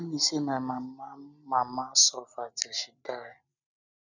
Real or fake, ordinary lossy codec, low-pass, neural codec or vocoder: real; none; 7.2 kHz; none